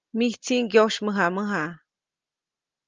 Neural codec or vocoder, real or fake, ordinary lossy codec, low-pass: none; real; Opus, 24 kbps; 7.2 kHz